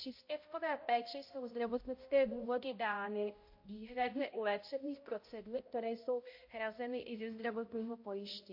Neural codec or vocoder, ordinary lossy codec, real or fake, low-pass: codec, 16 kHz, 0.5 kbps, X-Codec, HuBERT features, trained on balanced general audio; MP3, 32 kbps; fake; 5.4 kHz